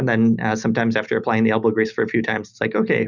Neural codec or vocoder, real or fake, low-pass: none; real; 7.2 kHz